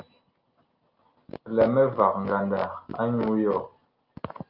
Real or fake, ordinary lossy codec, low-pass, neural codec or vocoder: real; Opus, 32 kbps; 5.4 kHz; none